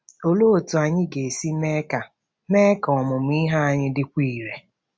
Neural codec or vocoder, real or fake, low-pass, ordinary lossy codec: none; real; none; none